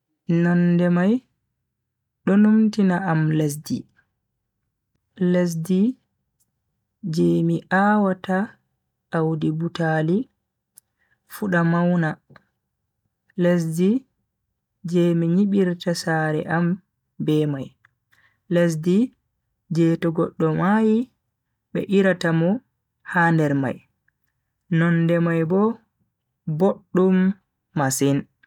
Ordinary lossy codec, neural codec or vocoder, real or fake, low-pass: none; none; real; 19.8 kHz